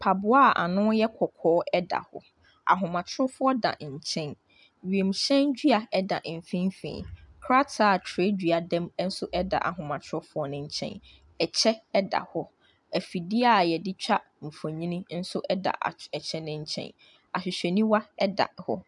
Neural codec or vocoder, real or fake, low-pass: none; real; 10.8 kHz